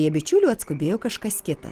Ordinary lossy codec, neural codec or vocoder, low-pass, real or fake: Opus, 16 kbps; none; 14.4 kHz; real